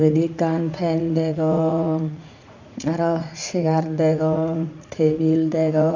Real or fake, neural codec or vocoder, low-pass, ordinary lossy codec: fake; vocoder, 44.1 kHz, 80 mel bands, Vocos; 7.2 kHz; MP3, 64 kbps